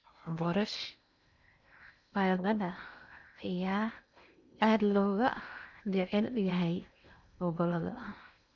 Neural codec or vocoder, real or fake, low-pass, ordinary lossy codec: codec, 16 kHz in and 24 kHz out, 0.8 kbps, FocalCodec, streaming, 65536 codes; fake; 7.2 kHz; none